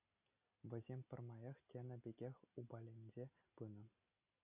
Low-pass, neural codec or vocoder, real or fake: 3.6 kHz; none; real